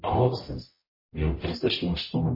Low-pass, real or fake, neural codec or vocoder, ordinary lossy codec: 5.4 kHz; fake; codec, 44.1 kHz, 0.9 kbps, DAC; MP3, 24 kbps